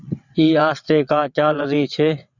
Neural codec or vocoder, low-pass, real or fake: vocoder, 22.05 kHz, 80 mel bands, WaveNeXt; 7.2 kHz; fake